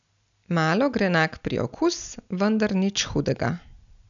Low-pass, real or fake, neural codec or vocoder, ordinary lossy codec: 7.2 kHz; real; none; none